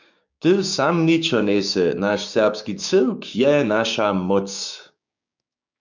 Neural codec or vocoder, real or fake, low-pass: codec, 16 kHz, 6 kbps, DAC; fake; 7.2 kHz